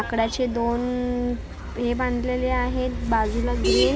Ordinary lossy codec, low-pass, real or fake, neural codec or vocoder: none; none; real; none